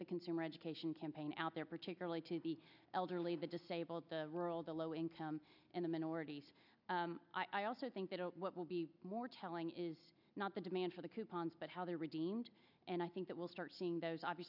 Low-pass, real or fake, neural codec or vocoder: 5.4 kHz; real; none